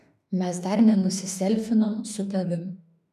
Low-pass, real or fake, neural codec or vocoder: 14.4 kHz; fake; autoencoder, 48 kHz, 32 numbers a frame, DAC-VAE, trained on Japanese speech